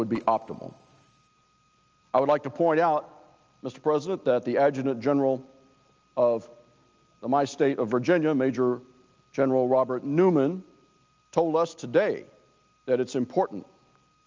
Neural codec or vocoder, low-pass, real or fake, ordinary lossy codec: none; 7.2 kHz; real; Opus, 24 kbps